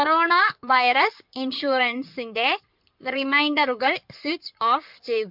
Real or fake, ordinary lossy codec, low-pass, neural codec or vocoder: fake; MP3, 48 kbps; 5.4 kHz; codec, 16 kHz in and 24 kHz out, 2.2 kbps, FireRedTTS-2 codec